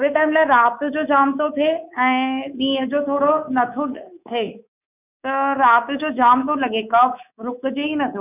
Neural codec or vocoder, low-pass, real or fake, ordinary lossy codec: codec, 24 kHz, 3.1 kbps, DualCodec; 3.6 kHz; fake; none